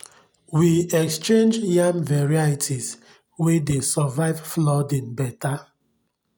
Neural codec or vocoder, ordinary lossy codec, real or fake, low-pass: none; none; real; none